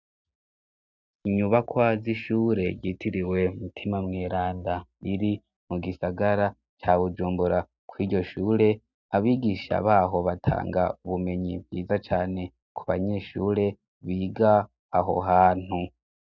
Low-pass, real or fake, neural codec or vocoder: 7.2 kHz; real; none